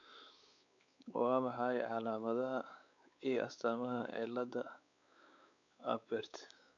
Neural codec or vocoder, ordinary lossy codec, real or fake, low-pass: codec, 16 kHz, 4 kbps, X-Codec, WavLM features, trained on Multilingual LibriSpeech; none; fake; 7.2 kHz